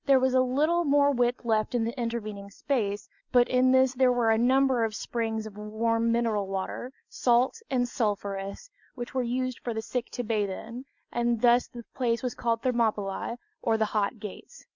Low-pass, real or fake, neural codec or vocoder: 7.2 kHz; real; none